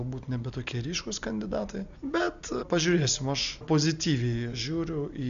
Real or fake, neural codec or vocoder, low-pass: real; none; 7.2 kHz